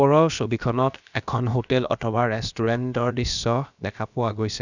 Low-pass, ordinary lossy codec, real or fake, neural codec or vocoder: 7.2 kHz; none; fake; codec, 16 kHz, about 1 kbps, DyCAST, with the encoder's durations